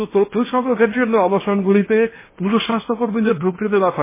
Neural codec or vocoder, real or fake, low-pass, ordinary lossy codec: codec, 16 kHz in and 24 kHz out, 0.8 kbps, FocalCodec, streaming, 65536 codes; fake; 3.6 kHz; MP3, 16 kbps